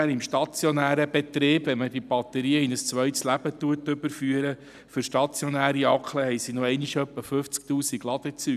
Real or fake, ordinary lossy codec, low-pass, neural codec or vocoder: real; none; 14.4 kHz; none